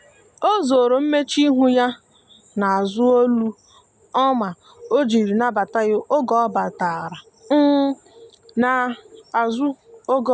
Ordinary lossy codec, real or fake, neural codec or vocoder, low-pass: none; real; none; none